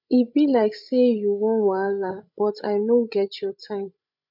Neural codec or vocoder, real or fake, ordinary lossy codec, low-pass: codec, 16 kHz, 16 kbps, FreqCodec, larger model; fake; none; 5.4 kHz